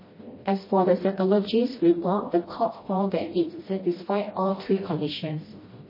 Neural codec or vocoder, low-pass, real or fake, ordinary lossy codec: codec, 16 kHz, 1 kbps, FreqCodec, smaller model; 5.4 kHz; fake; MP3, 24 kbps